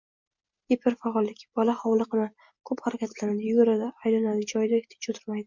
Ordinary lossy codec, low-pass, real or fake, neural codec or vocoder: MP3, 64 kbps; 7.2 kHz; real; none